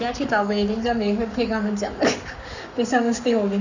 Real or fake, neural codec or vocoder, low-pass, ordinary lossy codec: fake; codec, 44.1 kHz, 7.8 kbps, Pupu-Codec; 7.2 kHz; none